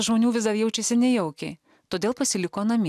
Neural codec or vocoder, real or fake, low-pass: none; real; 14.4 kHz